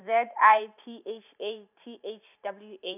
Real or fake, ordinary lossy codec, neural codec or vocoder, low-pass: fake; none; vocoder, 44.1 kHz, 128 mel bands every 512 samples, BigVGAN v2; 3.6 kHz